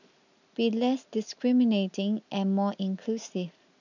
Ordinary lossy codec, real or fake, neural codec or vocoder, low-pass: none; real; none; 7.2 kHz